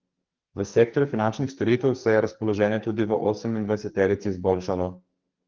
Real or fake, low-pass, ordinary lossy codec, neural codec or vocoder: fake; 7.2 kHz; Opus, 32 kbps; codec, 44.1 kHz, 2.6 kbps, SNAC